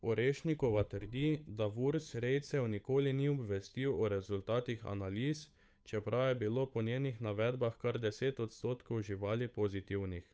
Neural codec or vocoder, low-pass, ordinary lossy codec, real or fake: codec, 16 kHz, 8 kbps, FunCodec, trained on Chinese and English, 25 frames a second; none; none; fake